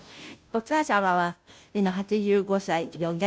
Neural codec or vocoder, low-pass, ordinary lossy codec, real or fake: codec, 16 kHz, 0.5 kbps, FunCodec, trained on Chinese and English, 25 frames a second; none; none; fake